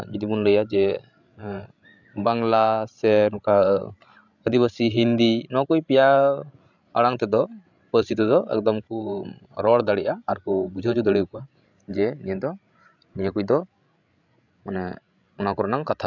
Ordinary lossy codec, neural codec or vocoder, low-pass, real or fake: none; codec, 16 kHz, 16 kbps, FreqCodec, larger model; 7.2 kHz; fake